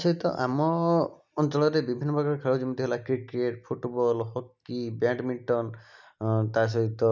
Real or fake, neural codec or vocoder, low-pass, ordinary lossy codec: real; none; 7.2 kHz; none